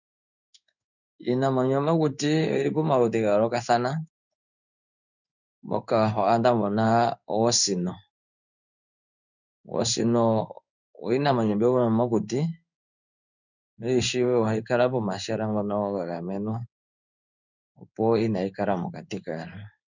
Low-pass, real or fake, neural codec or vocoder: 7.2 kHz; fake; codec, 16 kHz in and 24 kHz out, 1 kbps, XY-Tokenizer